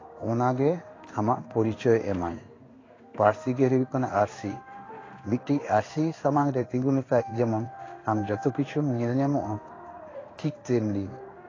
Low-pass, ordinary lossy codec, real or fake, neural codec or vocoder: 7.2 kHz; AAC, 32 kbps; fake; codec, 16 kHz in and 24 kHz out, 1 kbps, XY-Tokenizer